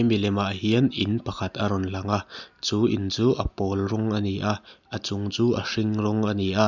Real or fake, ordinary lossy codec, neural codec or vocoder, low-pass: real; none; none; 7.2 kHz